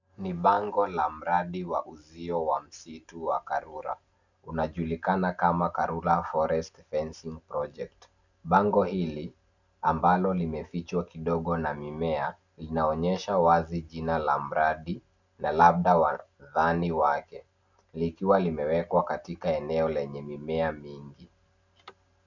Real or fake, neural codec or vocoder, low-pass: real; none; 7.2 kHz